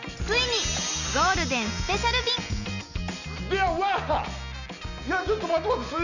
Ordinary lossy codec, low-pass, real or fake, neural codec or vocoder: none; 7.2 kHz; real; none